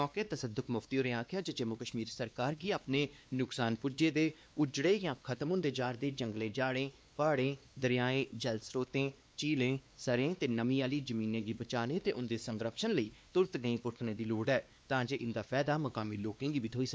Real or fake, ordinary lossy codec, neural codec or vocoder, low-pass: fake; none; codec, 16 kHz, 2 kbps, X-Codec, WavLM features, trained on Multilingual LibriSpeech; none